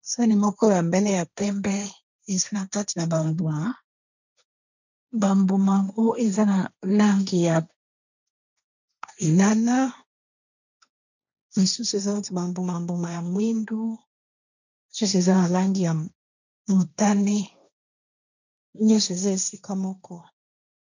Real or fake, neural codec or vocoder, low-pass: fake; codec, 16 kHz, 1.1 kbps, Voila-Tokenizer; 7.2 kHz